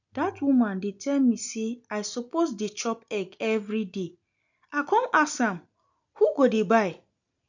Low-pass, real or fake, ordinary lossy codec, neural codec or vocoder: 7.2 kHz; real; none; none